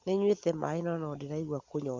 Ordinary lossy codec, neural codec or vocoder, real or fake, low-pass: Opus, 32 kbps; none; real; 7.2 kHz